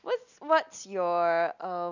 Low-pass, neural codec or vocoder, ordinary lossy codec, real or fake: 7.2 kHz; none; none; real